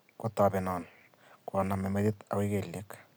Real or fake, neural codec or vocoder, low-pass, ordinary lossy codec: real; none; none; none